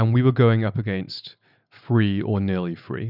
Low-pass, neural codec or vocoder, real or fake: 5.4 kHz; none; real